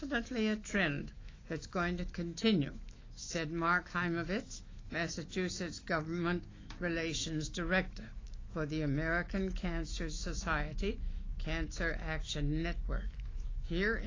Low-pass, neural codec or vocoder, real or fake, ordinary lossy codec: 7.2 kHz; autoencoder, 48 kHz, 128 numbers a frame, DAC-VAE, trained on Japanese speech; fake; AAC, 32 kbps